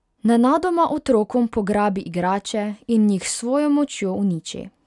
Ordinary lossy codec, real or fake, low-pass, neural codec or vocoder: none; real; 10.8 kHz; none